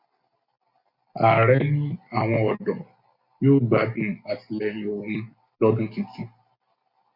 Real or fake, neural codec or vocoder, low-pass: real; none; 5.4 kHz